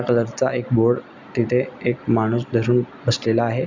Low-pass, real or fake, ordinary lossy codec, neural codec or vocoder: 7.2 kHz; real; none; none